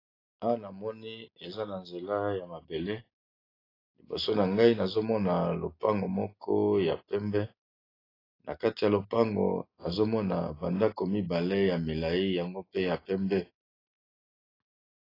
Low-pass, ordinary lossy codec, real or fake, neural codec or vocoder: 5.4 kHz; AAC, 24 kbps; real; none